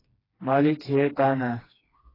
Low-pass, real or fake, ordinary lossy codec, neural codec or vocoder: 5.4 kHz; fake; AAC, 24 kbps; codec, 16 kHz, 2 kbps, FreqCodec, smaller model